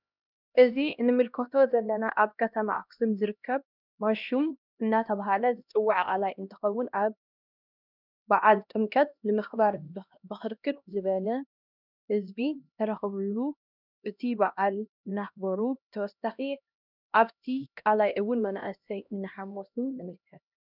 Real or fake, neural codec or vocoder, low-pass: fake; codec, 16 kHz, 1 kbps, X-Codec, HuBERT features, trained on LibriSpeech; 5.4 kHz